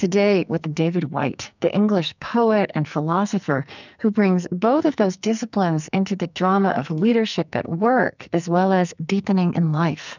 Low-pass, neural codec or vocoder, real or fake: 7.2 kHz; codec, 44.1 kHz, 2.6 kbps, SNAC; fake